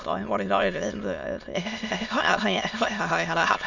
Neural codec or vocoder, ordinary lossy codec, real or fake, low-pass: autoencoder, 22.05 kHz, a latent of 192 numbers a frame, VITS, trained on many speakers; none; fake; 7.2 kHz